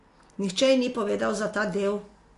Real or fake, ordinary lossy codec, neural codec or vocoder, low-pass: real; AAC, 48 kbps; none; 10.8 kHz